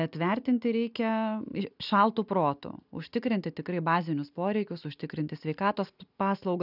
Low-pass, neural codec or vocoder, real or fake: 5.4 kHz; none; real